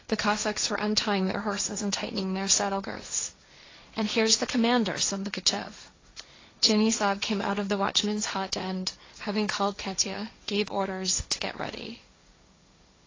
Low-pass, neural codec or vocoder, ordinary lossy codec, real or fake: 7.2 kHz; codec, 16 kHz, 1.1 kbps, Voila-Tokenizer; AAC, 32 kbps; fake